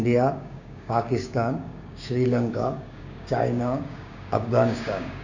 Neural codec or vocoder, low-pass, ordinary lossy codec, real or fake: codec, 16 kHz, 6 kbps, DAC; 7.2 kHz; none; fake